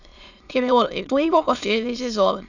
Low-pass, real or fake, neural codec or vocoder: 7.2 kHz; fake; autoencoder, 22.05 kHz, a latent of 192 numbers a frame, VITS, trained on many speakers